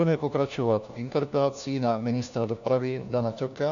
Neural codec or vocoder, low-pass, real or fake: codec, 16 kHz, 1 kbps, FunCodec, trained on LibriTTS, 50 frames a second; 7.2 kHz; fake